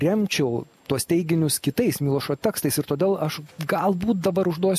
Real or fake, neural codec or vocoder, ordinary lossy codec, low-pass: real; none; MP3, 64 kbps; 14.4 kHz